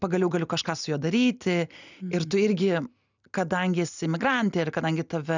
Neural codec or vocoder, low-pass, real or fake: none; 7.2 kHz; real